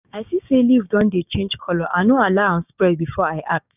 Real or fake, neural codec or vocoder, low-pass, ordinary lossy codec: fake; codec, 16 kHz, 6 kbps, DAC; 3.6 kHz; none